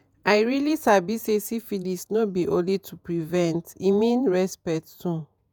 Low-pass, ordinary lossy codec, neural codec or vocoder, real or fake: none; none; vocoder, 48 kHz, 128 mel bands, Vocos; fake